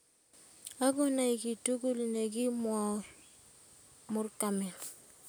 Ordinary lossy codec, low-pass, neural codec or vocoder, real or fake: none; none; none; real